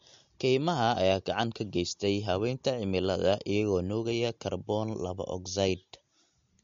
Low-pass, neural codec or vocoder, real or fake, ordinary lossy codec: 7.2 kHz; none; real; MP3, 48 kbps